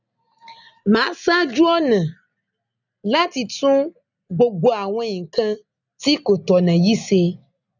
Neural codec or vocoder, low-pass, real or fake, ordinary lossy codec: none; 7.2 kHz; real; none